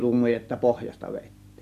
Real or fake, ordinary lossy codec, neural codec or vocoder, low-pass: real; none; none; 14.4 kHz